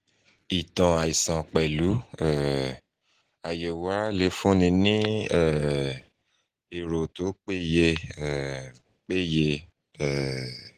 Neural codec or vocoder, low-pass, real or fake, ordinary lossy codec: none; 14.4 kHz; real; Opus, 16 kbps